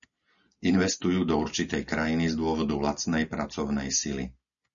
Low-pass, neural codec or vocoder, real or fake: 7.2 kHz; none; real